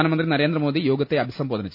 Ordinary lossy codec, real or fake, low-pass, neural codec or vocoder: none; real; 5.4 kHz; none